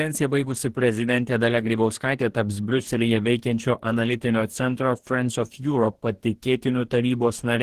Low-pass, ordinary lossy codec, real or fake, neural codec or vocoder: 14.4 kHz; Opus, 16 kbps; fake; codec, 44.1 kHz, 2.6 kbps, DAC